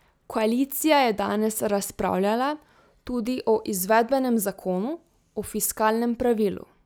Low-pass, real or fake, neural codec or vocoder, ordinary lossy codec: none; real; none; none